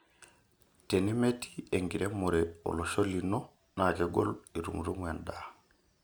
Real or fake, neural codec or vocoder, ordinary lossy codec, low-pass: real; none; none; none